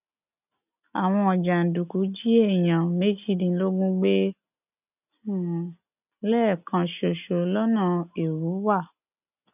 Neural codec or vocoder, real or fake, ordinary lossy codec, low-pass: none; real; none; 3.6 kHz